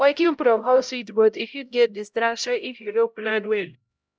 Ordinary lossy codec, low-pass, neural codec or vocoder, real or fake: none; none; codec, 16 kHz, 0.5 kbps, X-Codec, HuBERT features, trained on LibriSpeech; fake